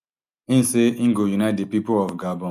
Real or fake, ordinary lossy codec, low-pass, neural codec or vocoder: real; none; 14.4 kHz; none